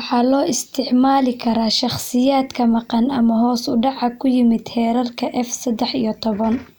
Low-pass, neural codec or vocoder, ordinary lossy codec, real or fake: none; none; none; real